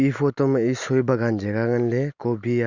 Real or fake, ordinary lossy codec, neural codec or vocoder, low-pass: real; none; none; 7.2 kHz